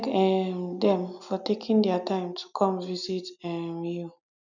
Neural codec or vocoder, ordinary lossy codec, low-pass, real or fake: none; none; 7.2 kHz; real